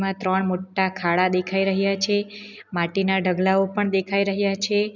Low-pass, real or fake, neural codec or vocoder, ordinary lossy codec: 7.2 kHz; real; none; none